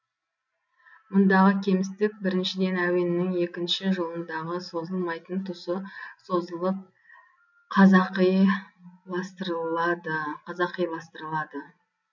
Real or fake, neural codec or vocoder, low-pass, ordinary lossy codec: real; none; 7.2 kHz; none